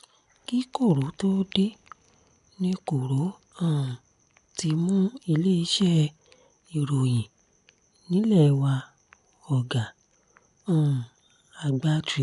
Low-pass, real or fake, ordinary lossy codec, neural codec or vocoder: 10.8 kHz; real; none; none